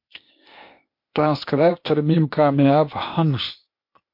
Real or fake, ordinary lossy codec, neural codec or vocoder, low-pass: fake; MP3, 32 kbps; codec, 16 kHz, 0.8 kbps, ZipCodec; 5.4 kHz